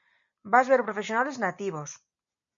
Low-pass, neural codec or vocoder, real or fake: 7.2 kHz; none; real